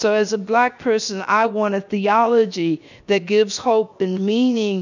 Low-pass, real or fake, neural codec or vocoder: 7.2 kHz; fake; codec, 16 kHz, 0.7 kbps, FocalCodec